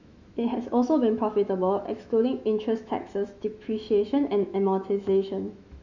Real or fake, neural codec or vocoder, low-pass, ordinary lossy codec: fake; autoencoder, 48 kHz, 128 numbers a frame, DAC-VAE, trained on Japanese speech; 7.2 kHz; none